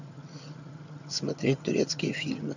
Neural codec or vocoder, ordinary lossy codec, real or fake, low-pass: vocoder, 22.05 kHz, 80 mel bands, HiFi-GAN; none; fake; 7.2 kHz